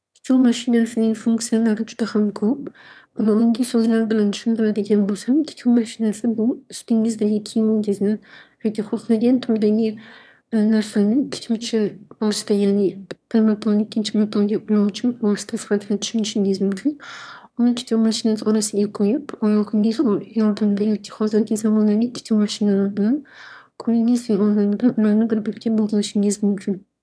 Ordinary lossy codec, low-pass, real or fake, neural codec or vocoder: none; none; fake; autoencoder, 22.05 kHz, a latent of 192 numbers a frame, VITS, trained on one speaker